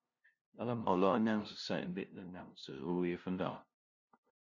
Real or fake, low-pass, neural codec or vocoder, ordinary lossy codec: fake; 7.2 kHz; codec, 16 kHz, 0.5 kbps, FunCodec, trained on LibriTTS, 25 frames a second; MP3, 64 kbps